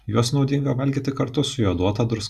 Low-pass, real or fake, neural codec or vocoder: 14.4 kHz; real; none